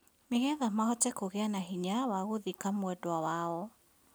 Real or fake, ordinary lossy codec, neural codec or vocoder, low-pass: real; none; none; none